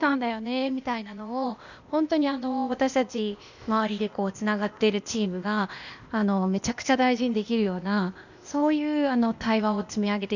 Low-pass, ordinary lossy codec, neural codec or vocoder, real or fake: 7.2 kHz; none; codec, 16 kHz, 0.8 kbps, ZipCodec; fake